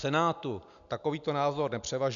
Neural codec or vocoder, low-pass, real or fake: none; 7.2 kHz; real